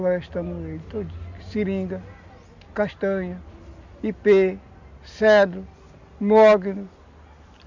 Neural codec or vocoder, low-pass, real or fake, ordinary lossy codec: none; 7.2 kHz; real; none